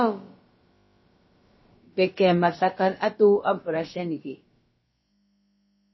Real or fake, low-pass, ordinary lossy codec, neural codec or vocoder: fake; 7.2 kHz; MP3, 24 kbps; codec, 16 kHz, about 1 kbps, DyCAST, with the encoder's durations